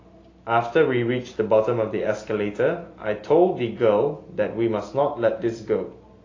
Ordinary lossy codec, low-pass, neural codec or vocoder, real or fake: AAC, 32 kbps; 7.2 kHz; none; real